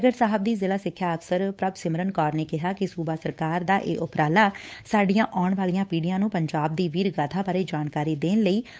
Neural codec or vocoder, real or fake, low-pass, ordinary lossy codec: codec, 16 kHz, 8 kbps, FunCodec, trained on Chinese and English, 25 frames a second; fake; none; none